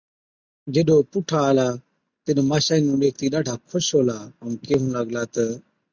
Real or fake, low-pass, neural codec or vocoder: real; 7.2 kHz; none